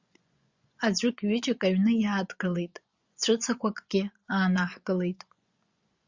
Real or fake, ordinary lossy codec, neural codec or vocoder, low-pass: fake; Opus, 64 kbps; vocoder, 24 kHz, 100 mel bands, Vocos; 7.2 kHz